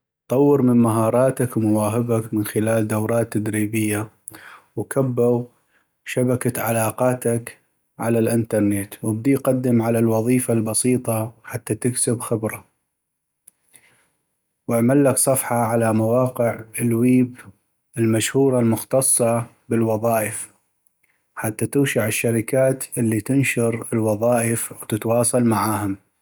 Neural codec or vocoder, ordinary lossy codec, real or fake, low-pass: none; none; real; none